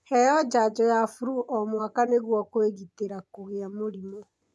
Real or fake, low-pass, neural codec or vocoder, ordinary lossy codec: fake; none; vocoder, 24 kHz, 100 mel bands, Vocos; none